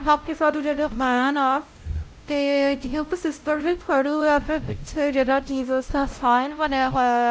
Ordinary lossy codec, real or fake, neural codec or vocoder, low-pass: none; fake; codec, 16 kHz, 0.5 kbps, X-Codec, WavLM features, trained on Multilingual LibriSpeech; none